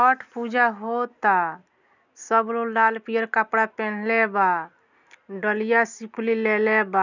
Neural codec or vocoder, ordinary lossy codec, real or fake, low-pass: none; none; real; 7.2 kHz